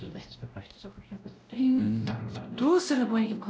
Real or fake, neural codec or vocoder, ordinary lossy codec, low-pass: fake; codec, 16 kHz, 0.5 kbps, X-Codec, WavLM features, trained on Multilingual LibriSpeech; none; none